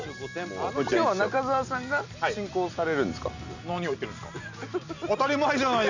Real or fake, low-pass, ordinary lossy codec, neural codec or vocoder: real; 7.2 kHz; none; none